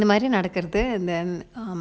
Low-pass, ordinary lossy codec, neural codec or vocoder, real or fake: none; none; none; real